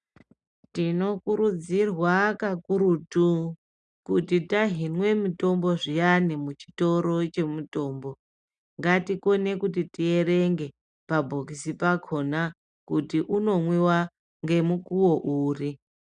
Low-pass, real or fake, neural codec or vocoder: 9.9 kHz; real; none